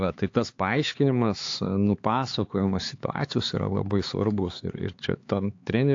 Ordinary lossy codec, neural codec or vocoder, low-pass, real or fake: AAC, 48 kbps; codec, 16 kHz, 4 kbps, X-Codec, HuBERT features, trained on LibriSpeech; 7.2 kHz; fake